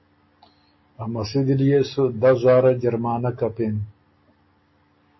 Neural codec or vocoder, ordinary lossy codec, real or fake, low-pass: none; MP3, 24 kbps; real; 7.2 kHz